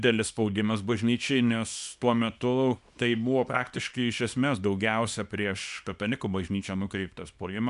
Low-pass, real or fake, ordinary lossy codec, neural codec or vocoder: 10.8 kHz; fake; MP3, 96 kbps; codec, 24 kHz, 0.9 kbps, WavTokenizer, small release